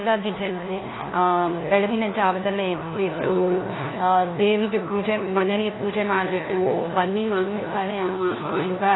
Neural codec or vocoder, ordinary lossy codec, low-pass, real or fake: codec, 16 kHz, 1 kbps, FunCodec, trained on LibriTTS, 50 frames a second; AAC, 16 kbps; 7.2 kHz; fake